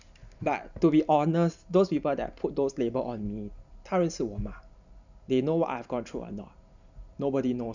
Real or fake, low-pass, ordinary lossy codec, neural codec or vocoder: real; 7.2 kHz; none; none